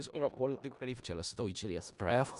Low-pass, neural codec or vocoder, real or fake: 10.8 kHz; codec, 16 kHz in and 24 kHz out, 0.4 kbps, LongCat-Audio-Codec, four codebook decoder; fake